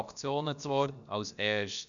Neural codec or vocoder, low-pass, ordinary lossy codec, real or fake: codec, 16 kHz, about 1 kbps, DyCAST, with the encoder's durations; 7.2 kHz; none; fake